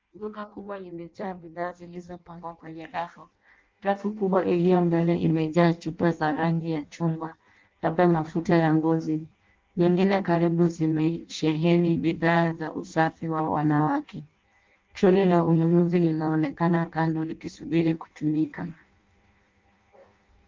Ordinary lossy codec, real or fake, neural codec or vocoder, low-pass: Opus, 24 kbps; fake; codec, 16 kHz in and 24 kHz out, 0.6 kbps, FireRedTTS-2 codec; 7.2 kHz